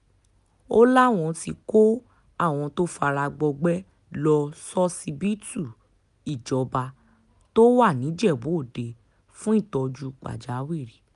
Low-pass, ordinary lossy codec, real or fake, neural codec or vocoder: 10.8 kHz; none; real; none